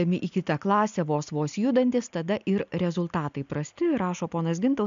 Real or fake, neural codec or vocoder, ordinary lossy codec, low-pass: real; none; MP3, 64 kbps; 7.2 kHz